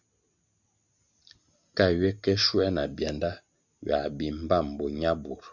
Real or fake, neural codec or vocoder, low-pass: real; none; 7.2 kHz